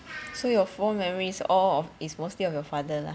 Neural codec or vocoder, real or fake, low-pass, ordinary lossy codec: none; real; none; none